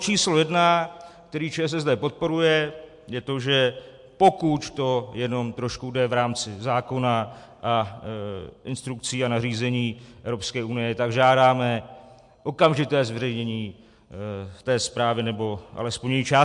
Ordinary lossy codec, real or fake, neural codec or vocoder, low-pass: MP3, 64 kbps; real; none; 10.8 kHz